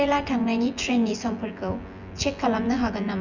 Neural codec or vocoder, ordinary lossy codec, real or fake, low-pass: vocoder, 24 kHz, 100 mel bands, Vocos; none; fake; 7.2 kHz